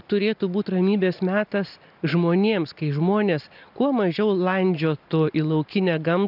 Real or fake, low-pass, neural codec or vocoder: real; 5.4 kHz; none